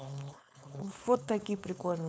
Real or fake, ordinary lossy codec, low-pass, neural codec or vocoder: fake; none; none; codec, 16 kHz, 4.8 kbps, FACodec